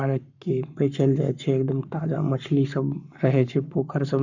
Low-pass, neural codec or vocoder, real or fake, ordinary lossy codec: 7.2 kHz; codec, 44.1 kHz, 7.8 kbps, Pupu-Codec; fake; none